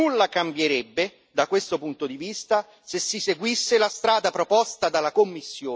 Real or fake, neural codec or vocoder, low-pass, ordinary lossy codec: real; none; none; none